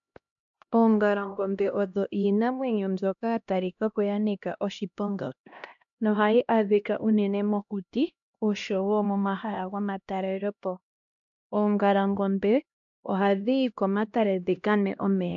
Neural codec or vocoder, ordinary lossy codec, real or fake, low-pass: codec, 16 kHz, 1 kbps, X-Codec, HuBERT features, trained on LibriSpeech; AAC, 64 kbps; fake; 7.2 kHz